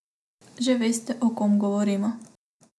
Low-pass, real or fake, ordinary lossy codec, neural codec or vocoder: none; real; none; none